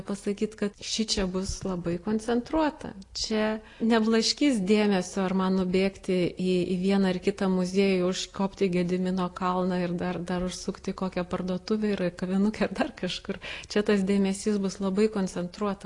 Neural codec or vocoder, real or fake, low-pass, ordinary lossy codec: none; real; 10.8 kHz; AAC, 48 kbps